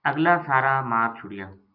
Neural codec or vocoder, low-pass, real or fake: none; 5.4 kHz; real